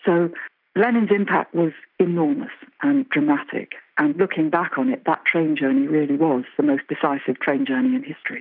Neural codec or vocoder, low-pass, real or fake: none; 5.4 kHz; real